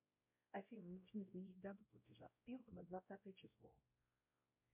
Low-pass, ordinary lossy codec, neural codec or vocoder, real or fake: 3.6 kHz; MP3, 32 kbps; codec, 16 kHz, 0.5 kbps, X-Codec, WavLM features, trained on Multilingual LibriSpeech; fake